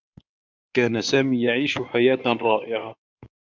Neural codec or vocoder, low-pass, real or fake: codec, 16 kHz in and 24 kHz out, 2.2 kbps, FireRedTTS-2 codec; 7.2 kHz; fake